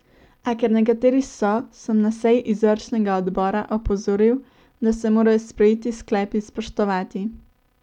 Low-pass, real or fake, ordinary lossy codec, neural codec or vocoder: 19.8 kHz; real; none; none